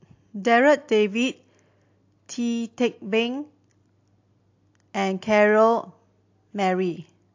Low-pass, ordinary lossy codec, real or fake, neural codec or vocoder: 7.2 kHz; AAC, 48 kbps; real; none